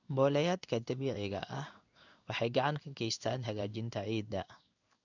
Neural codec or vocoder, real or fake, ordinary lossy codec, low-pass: codec, 16 kHz in and 24 kHz out, 1 kbps, XY-Tokenizer; fake; none; 7.2 kHz